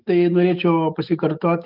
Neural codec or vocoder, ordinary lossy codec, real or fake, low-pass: autoencoder, 48 kHz, 128 numbers a frame, DAC-VAE, trained on Japanese speech; Opus, 32 kbps; fake; 5.4 kHz